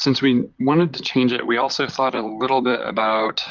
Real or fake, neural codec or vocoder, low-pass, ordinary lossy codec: fake; vocoder, 44.1 kHz, 128 mel bands, Pupu-Vocoder; 7.2 kHz; Opus, 32 kbps